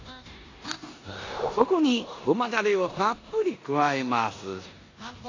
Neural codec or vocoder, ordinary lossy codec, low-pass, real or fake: codec, 16 kHz in and 24 kHz out, 0.9 kbps, LongCat-Audio-Codec, four codebook decoder; AAC, 32 kbps; 7.2 kHz; fake